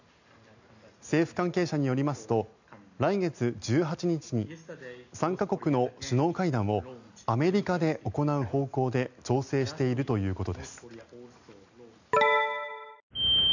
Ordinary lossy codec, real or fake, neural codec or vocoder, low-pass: none; real; none; 7.2 kHz